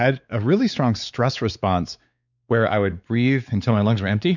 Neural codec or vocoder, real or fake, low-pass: codec, 16 kHz, 4 kbps, X-Codec, WavLM features, trained on Multilingual LibriSpeech; fake; 7.2 kHz